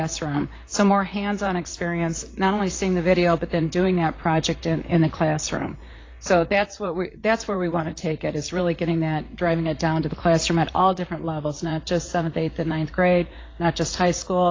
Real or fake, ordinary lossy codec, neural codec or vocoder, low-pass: fake; AAC, 32 kbps; vocoder, 44.1 kHz, 128 mel bands, Pupu-Vocoder; 7.2 kHz